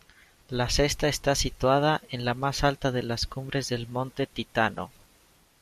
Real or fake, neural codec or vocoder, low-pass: fake; vocoder, 44.1 kHz, 128 mel bands every 256 samples, BigVGAN v2; 14.4 kHz